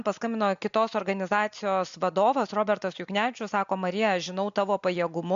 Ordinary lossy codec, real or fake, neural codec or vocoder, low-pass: MP3, 64 kbps; real; none; 7.2 kHz